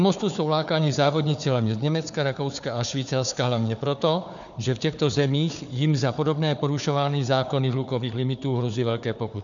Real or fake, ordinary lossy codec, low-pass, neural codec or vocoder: fake; MP3, 96 kbps; 7.2 kHz; codec, 16 kHz, 4 kbps, FunCodec, trained on Chinese and English, 50 frames a second